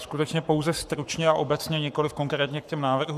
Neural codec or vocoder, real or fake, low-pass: codec, 44.1 kHz, 7.8 kbps, Pupu-Codec; fake; 14.4 kHz